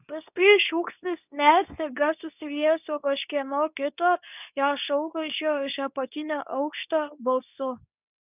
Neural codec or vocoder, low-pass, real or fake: codec, 24 kHz, 0.9 kbps, WavTokenizer, medium speech release version 2; 3.6 kHz; fake